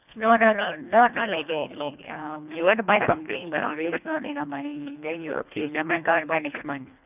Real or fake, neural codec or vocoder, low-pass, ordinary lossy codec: fake; codec, 24 kHz, 1.5 kbps, HILCodec; 3.6 kHz; none